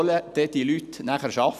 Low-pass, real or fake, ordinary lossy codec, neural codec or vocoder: 14.4 kHz; real; none; none